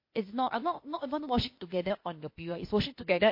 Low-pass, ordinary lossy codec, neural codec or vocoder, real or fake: 5.4 kHz; MP3, 32 kbps; codec, 16 kHz, 0.8 kbps, ZipCodec; fake